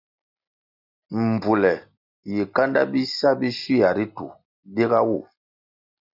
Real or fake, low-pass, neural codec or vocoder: real; 5.4 kHz; none